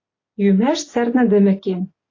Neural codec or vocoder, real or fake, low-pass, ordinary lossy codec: none; real; 7.2 kHz; AAC, 32 kbps